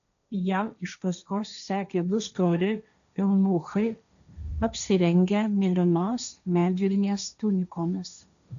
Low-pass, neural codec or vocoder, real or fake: 7.2 kHz; codec, 16 kHz, 1.1 kbps, Voila-Tokenizer; fake